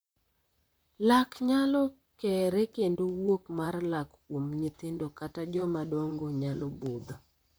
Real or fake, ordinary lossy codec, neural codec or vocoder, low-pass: fake; none; vocoder, 44.1 kHz, 128 mel bands, Pupu-Vocoder; none